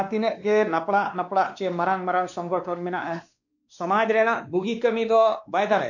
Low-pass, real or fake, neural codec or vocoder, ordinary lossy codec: 7.2 kHz; fake; codec, 16 kHz, 2 kbps, X-Codec, WavLM features, trained on Multilingual LibriSpeech; none